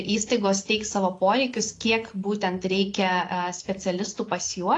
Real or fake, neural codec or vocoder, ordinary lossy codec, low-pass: real; none; AAC, 48 kbps; 10.8 kHz